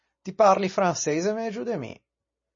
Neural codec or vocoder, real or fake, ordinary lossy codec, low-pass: none; real; MP3, 32 kbps; 7.2 kHz